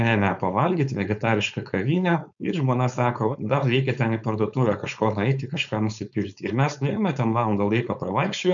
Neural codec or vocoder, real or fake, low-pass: codec, 16 kHz, 4.8 kbps, FACodec; fake; 7.2 kHz